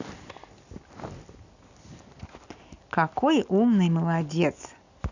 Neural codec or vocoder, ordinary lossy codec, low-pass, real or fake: none; none; 7.2 kHz; real